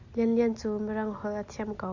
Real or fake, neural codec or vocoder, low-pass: real; none; 7.2 kHz